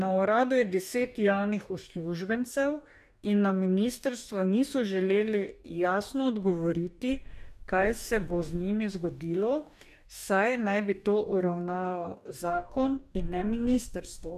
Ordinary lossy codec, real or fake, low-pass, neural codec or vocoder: none; fake; 14.4 kHz; codec, 44.1 kHz, 2.6 kbps, DAC